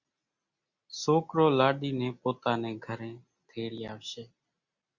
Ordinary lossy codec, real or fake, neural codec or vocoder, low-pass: Opus, 64 kbps; real; none; 7.2 kHz